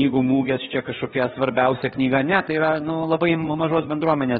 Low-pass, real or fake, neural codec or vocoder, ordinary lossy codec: 7.2 kHz; fake; codec, 16 kHz, 4 kbps, FreqCodec, larger model; AAC, 16 kbps